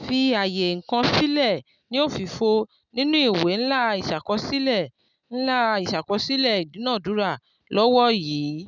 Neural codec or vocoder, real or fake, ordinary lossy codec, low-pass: none; real; none; 7.2 kHz